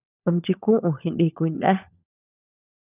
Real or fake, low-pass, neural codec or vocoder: fake; 3.6 kHz; codec, 16 kHz, 16 kbps, FunCodec, trained on LibriTTS, 50 frames a second